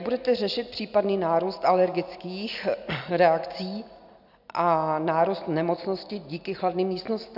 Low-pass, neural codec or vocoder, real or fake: 5.4 kHz; none; real